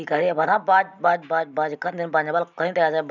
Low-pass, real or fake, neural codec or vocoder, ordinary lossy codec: 7.2 kHz; real; none; none